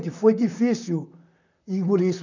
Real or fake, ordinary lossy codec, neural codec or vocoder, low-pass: fake; none; vocoder, 44.1 kHz, 128 mel bands, Pupu-Vocoder; 7.2 kHz